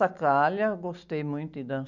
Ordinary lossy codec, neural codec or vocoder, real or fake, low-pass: none; none; real; 7.2 kHz